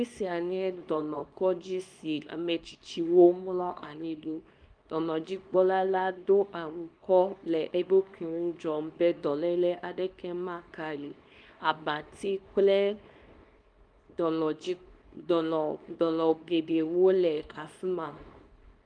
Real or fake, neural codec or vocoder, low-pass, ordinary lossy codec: fake; codec, 24 kHz, 0.9 kbps, WavTokenizer, small release; 9.9 kHz; Opus, 32 kbps